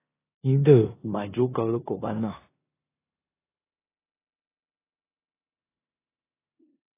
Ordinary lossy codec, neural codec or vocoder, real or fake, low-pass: AAC, 16 kbps; codec, 16 kHz in and 24 kHz out, 0.9 kbps, LongCat-Audio-Codec, four codebook decoder; fake; 3.6 kHz